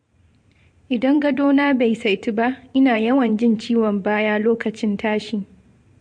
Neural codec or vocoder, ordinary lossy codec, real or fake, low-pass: vocoder, 44.1 kHz, 128 mel bands every 512 samples, BigVGAN v2; MP3, 48 kbps; fake; 9.9 kHz